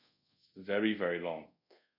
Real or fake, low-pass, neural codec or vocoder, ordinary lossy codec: fake; 5.4 kHz; codec, 24 kHz, 0.5 kbps, DualCodec; none